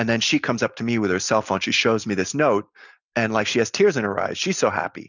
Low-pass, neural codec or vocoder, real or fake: 7.2 kHz; none; real